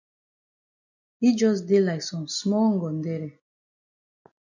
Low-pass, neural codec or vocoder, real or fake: 7.2 kHz; none; real